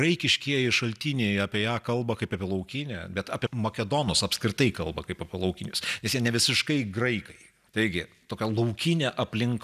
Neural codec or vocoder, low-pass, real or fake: none; 14.4 kHz; real